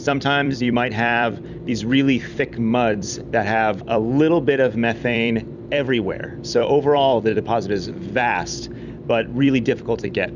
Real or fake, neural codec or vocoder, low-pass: fake; vocoder, 44.1 kHz, 128 mel bands every 256 samples, BigVGAN v2; 7.2 kHz